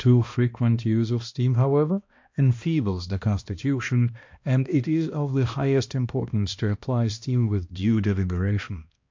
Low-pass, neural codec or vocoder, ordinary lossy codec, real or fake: 7.2 kHz; codec, 16 kHz, 1 kbps, X-Codec, HuBERT features, trained on balanced general audio; MP3, 48 kbps; fake